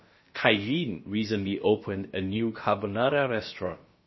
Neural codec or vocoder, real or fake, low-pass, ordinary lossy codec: codec, 16 kHz, about 1 kbps, DyCAST, with the encoder's durations; fake; 7.2 kHz; MP3, 24 kbps